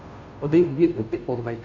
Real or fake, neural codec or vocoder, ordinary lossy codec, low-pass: fake; codec, 16 kHz, 0.5 kbps, FunCodec, trained on Chinese and English, 25 frames a second; MP3, 64 kbps; 7.2 kHz